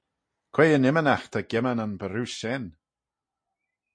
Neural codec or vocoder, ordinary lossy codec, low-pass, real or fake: none; MP3, 48 kbps; 9.9 kHz; real